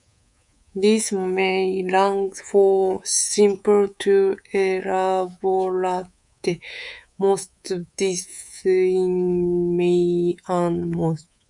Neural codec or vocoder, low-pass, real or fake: codec, 24 kHz, 3.1 kbps, DualCodec; 10.8 kHz; fake